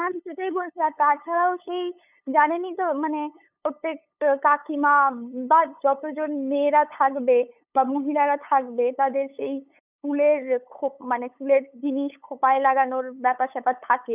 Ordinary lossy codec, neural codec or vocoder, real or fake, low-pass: none; codec, 16 kHz, 8 kbps, FunCodec, trained on LibriTTS, 25 frames a second; fake; 3.6 kHz